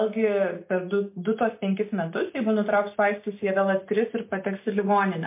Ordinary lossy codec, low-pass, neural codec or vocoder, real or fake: MP3, 24 kbps; 3.6 kHz; none; real